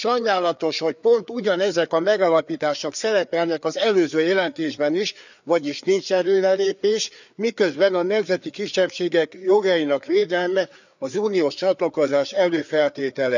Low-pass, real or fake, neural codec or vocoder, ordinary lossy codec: 7.2 kHz; fake; codec, 16 kHz, 4 kbps, FreqCodec, larger model; none